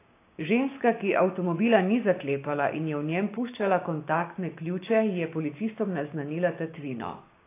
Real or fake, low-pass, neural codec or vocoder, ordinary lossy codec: fake; 3.6 kHz; codec, 16 kHz, 6 kbps, DAC; AAC, 24 kbps